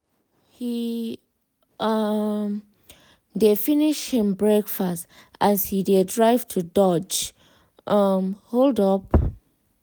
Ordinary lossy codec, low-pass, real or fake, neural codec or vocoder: none; none; real; none